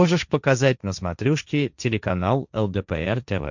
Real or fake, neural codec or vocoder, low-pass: fake; codec, 16 kHz, 1.1 kbps, Voila-Tokenizer; 7.2 kHz